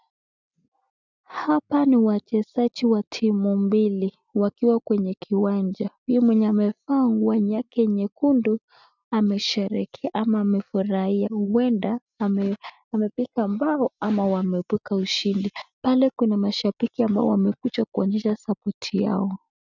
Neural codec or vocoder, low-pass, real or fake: none; 7.2 kHz; real